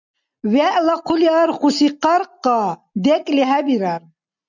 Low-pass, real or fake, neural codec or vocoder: 7.2 kHz; real; none